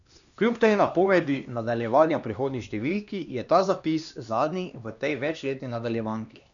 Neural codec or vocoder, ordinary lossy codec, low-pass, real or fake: codec, 16 kHz, 2 kbps, X-Codec, HuBERT features, trained on LibriSpeech; none; 7.2 kHz; fake